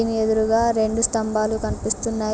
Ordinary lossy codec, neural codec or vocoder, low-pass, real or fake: none; none; none; real